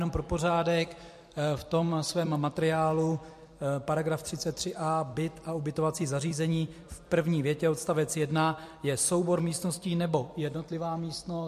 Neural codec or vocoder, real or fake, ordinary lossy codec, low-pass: vocoder, 44.1 kHz, 128 mel bands every 256 samples, BigVGAN v2; fake; MP3, 64 kbps; 14.4 kHz